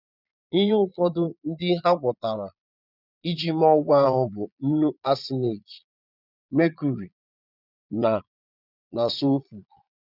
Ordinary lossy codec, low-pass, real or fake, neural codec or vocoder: none; 5.4 kHz; fake; vocoder, 22.05 kHz, 80 mel bands, Vocos